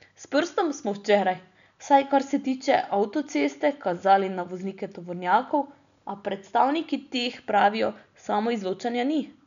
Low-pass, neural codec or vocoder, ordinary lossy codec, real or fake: 7.2 kHz; none; none; real